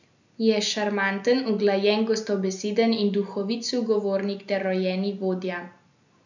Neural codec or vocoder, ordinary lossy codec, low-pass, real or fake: none; none; 7.2 kHz; real